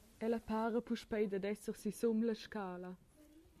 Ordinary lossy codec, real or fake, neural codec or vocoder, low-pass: MP3, 96 kbps; real; none; 14.4 kHz